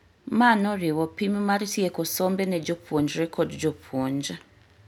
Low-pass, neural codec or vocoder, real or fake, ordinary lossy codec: 19.8 kHz; none; real; none